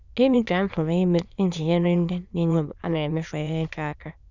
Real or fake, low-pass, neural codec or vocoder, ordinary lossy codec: fake; 7.2 kHz; autoencoder, 22.05 kHz, a latent of 192 numbers a frame, VITS, trained on many speakers; none